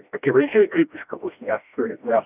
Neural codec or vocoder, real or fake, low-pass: codec, 16 kHz, 1 kbps, FreqCodec, smaller model; fake; 3.6 kHz